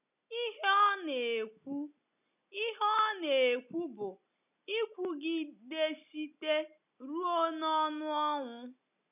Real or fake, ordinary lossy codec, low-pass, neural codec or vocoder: real; none; 3.6 kHz; none